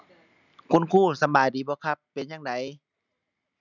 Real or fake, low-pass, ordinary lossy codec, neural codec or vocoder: real; 7.2 kHz; none; none